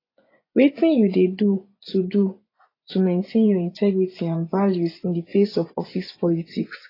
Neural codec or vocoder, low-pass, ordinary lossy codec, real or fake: vocoder, 24 kHz, 100 mel bands, Vocos; 5.4 kHz; AAC, 24 kbps; fake